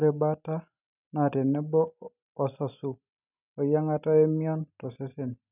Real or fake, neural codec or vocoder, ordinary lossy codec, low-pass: real; none; none; 3.6 kHz